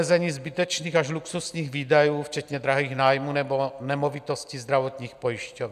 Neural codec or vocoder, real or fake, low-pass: none; real; 14.4 kHz